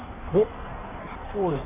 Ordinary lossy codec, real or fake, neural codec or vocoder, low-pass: none; fake; codec, 16 kHz in and 24 kHz out, 1.1 kbps, FireRedTTS-2 codec; 3.6 kHz